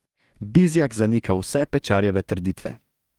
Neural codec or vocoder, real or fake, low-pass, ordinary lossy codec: codec, 44.1 kHz, 2.6 kbps, DAC; fake; 19.8 kHz; Opus, 32 kbps